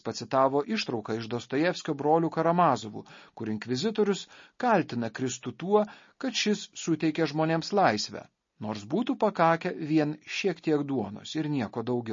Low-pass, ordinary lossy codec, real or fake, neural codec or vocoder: 7.2 kHz; MP3, 32 kbps; real; none